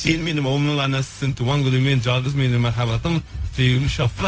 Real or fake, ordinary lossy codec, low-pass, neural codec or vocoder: fake; none; none; codec, 16 kHz, 0.4 kbps, LongCat-Audio-Codec